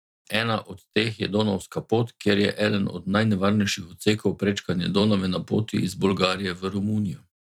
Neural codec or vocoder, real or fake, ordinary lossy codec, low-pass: vocoder, 44.1 kHz, 128 mel bands every 256 samples, BigVGAN v2; fake; none; 19.8 kHz